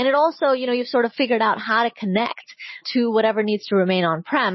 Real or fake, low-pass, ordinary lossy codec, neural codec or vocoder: real; 7.2 kHz; MP3, 24 kbps; none